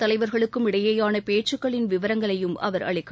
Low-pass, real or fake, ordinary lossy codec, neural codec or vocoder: none; real; none; none